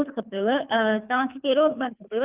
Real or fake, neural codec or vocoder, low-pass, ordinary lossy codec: fake; codec, 24 kHz, 3 kbps, HILCodec; 3.6 kHz; Opus, 24 kbps